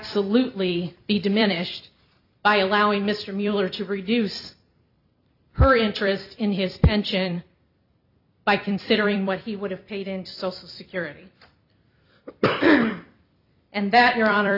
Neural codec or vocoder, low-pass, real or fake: vocoder, 44.1 kHz, 128 mel bands every 256 samples, BigVGAN v2; 5.4 kHz; fake